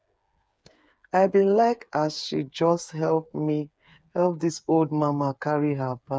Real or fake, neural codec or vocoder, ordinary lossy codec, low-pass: fake; codec, 16 kHz, 8 kbps, FreqCodec, smaller model; none; none